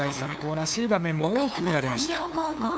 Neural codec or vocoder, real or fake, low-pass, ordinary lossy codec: codec, 16 kHz, 2 kbps, FunCodec, trained on LibriTTS, 25 frames a second; fake; none; none